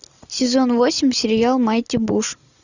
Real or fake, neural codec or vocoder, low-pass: real; none; 7.2 kHz